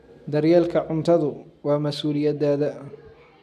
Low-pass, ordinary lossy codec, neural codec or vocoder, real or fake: 14.4 kHz; none; none; real